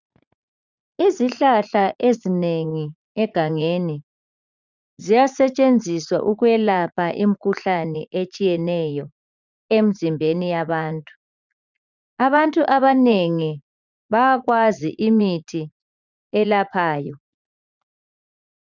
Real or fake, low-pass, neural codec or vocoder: fake; 7.2 kHz; vocoder, 44.1 kHz, 80 mel bands, Vocos